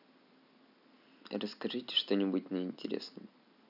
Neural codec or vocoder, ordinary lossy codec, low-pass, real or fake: none; none; 5.4 kHz; real